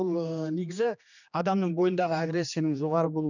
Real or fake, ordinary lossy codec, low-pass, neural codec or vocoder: fake; none; 7.2 kHz; codec, 16 kHz, 2 kbps, X-Codec, HuBERT features, trained on general audio